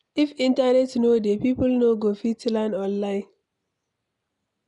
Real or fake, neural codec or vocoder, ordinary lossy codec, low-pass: real; none; none; 10.8 kHz